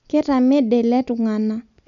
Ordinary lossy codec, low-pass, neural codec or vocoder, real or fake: none; 7.2 kHz; none; real